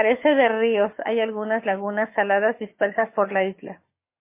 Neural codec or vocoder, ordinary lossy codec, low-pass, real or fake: codec, 44.1 kHz, 7.8 kbps, Pupu-Codec; MP3, 24 kbps; 3.6 kHz; fake